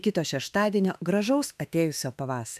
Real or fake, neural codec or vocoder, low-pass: fake; autoencoder, 48 kHz, 32 numbers a frame, DAC-VAE, trained on Japanese speech; 14.4 kHz